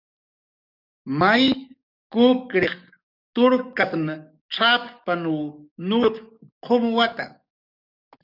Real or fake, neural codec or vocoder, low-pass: fake; codec, 44.1 kHz, 7.8 kbps, DAC; 5.4 kHz